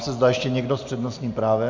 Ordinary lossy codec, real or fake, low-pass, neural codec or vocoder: MP3, 64 kbps; real; 7.2 kHz; none